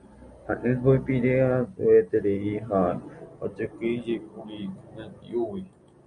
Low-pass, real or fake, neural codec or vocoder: 9.9 kHz; real; none